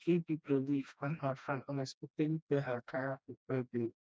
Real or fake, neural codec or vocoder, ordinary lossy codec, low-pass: fake; codec, 16 kHz, 1 kbps, FreqCodec, smaller model; none; none